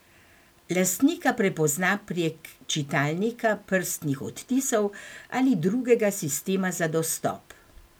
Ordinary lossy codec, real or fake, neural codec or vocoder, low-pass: none; real; none; none